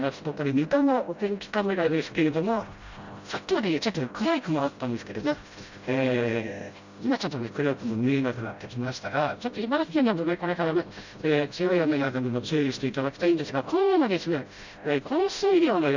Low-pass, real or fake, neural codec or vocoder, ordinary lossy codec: 7.2 kHz; fake; codec, 16 kHz, 0.5 kbps, FreqCodec, smaller model; Opus, 64 kbps